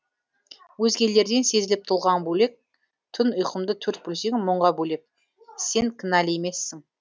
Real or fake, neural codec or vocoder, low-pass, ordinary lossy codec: real; none; none; none